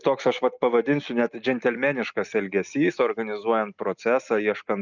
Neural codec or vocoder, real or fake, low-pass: none; real; 7.2 kHz